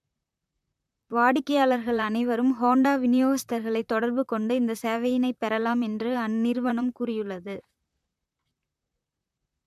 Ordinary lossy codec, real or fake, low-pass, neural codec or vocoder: MP3, 96 kbps; fake; 14.4 kHz; vocoder, 44.1 kHz, 128 mel bands, Pupu-Vocoder